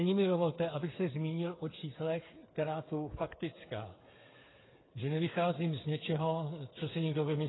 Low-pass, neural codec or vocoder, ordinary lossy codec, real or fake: 7.2 kHz; codec, 16 kHz, 8 kbps, FreqCodec, smaller model; AAC, 16 kbps; fake